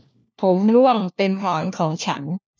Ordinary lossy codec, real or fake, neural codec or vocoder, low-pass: none; fake; codec, 16 kHz, 1 kbps, FunCodec, trained on LibriTTS, 50 frames a second; none